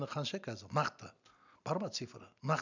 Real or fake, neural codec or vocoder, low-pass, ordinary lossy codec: real; none; 7.2 kHz; none